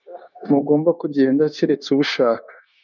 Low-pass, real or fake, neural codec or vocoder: 7.2 kHz; fake; codec, 16 kHz, 0.9 kbps, LongCat-Audio-Codec